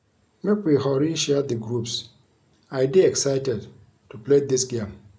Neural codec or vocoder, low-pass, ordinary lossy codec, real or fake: none; none; none; real